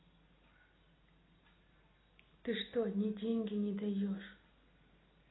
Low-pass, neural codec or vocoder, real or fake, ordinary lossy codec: 7.2 kHz; none; real; AAC, 16 kbps